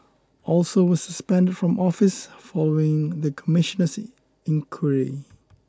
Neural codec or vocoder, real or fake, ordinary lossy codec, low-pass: none; real; none; none